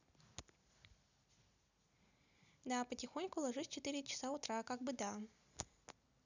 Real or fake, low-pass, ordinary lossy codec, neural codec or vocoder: real; 7.2 kHz; none; none